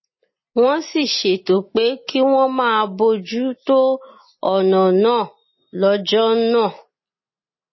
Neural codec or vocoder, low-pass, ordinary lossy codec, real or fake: none; 7.2 kHz; MP3, 24 kbps; real